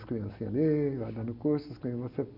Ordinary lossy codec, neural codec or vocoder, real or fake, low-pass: none; none; real; 5.4 kHz